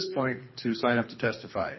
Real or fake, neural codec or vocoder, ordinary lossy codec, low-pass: fake; codec, 24 kHz, 3 kbps, HILCodec; MP3, 24 kbps; 7.2 kHz